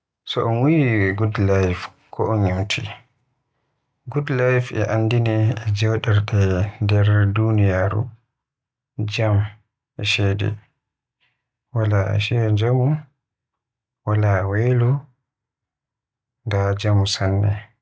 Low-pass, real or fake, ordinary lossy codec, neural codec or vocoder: none; real; none; none